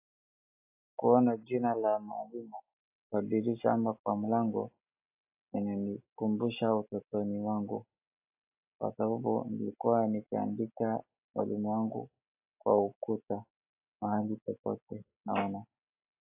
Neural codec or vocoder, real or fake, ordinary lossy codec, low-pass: none; real; AAC, 32 kbps; 3.6 kHz